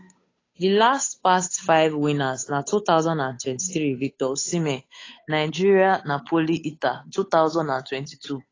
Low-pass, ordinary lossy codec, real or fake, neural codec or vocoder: 7.2 kHz; AAC, 32 kbps; fake; codec, 16 kHz, 8 kbps, FunCodec, trained on Chinese and English, 25 frames a second